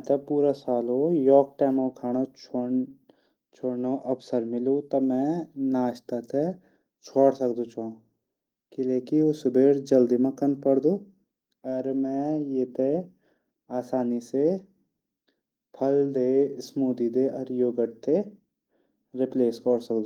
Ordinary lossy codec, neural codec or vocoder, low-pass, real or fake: Opus, 24 kbps; none; 19.8 kHz; real